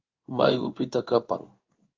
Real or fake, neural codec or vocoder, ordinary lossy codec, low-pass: fake; codec, 16 kHz in and 24 kHz out, 1 kbps, XY-Tokenizer; Opus, 32 kbps; 7.2 kHz